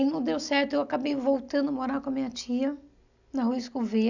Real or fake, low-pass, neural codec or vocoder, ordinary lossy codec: real; 7.2 kHz; none; none